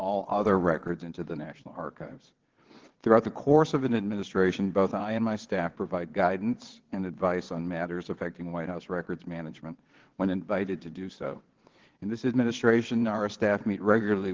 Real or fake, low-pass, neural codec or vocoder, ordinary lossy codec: fake; 7.2 kHz; vocoder, 22.05 kHz, 80 mel bands, WaveNeXt; Opus, 16 kbps